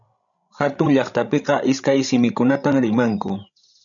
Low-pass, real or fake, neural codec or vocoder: 7.2 kHz; fake; codec, 16 kHz, 16 kbps, FreqCodec, larger model